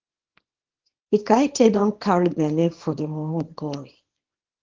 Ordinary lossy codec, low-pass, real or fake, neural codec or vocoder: Opus, 16 kbps; 7.2 kHz; fake; codec, 24 kHz, 0.9 kbps, WavTokenizer, small release